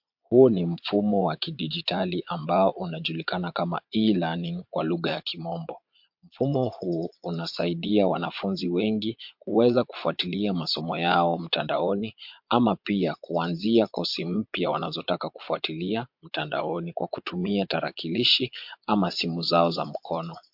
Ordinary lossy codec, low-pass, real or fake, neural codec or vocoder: MP3, 48 kbps; 5.4 kHz; fake; vocoder, 22.05 kHz, 80 mel bands, Vocos